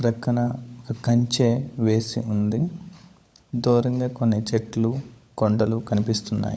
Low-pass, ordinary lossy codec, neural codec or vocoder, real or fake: none; none; codec, 16 kHz, 16 kbps, FunCodec, trained on LibriTTS, 50 frames a second; fake